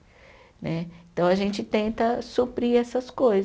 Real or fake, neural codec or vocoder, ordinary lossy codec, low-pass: real; none; none; none